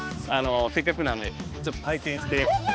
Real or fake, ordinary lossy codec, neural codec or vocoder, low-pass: fake; none; codec, 16 kHz, 2 kbps, X-Codec, HuBERT features, trained on balanced general audio; none